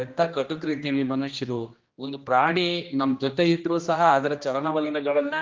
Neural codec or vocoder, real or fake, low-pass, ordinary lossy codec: codec, 16 kHz, 1 kbps, X-Codec, HuBERT features, trained on general audio; fake; 7.2 kHz; Opus, 32 kbps